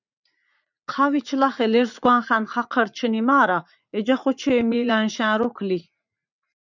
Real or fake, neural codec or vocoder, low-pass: fake; vocoder, 44.1 kHz, 80 mel bands, Vocos; 7.2 kHz